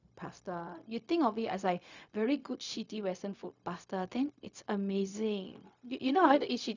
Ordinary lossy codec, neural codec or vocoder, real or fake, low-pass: none; codec, 16 kHz, 0.4 kbps, LongCat-Audio-Codec; fake; 7.2 kHz